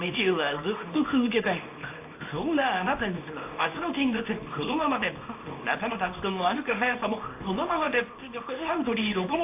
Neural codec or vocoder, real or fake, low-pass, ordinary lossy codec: codec, 24 kHz, 0.9 kbps, WavTokenizer, small release; fake; 3.6 kHz; AAC, 24 kbps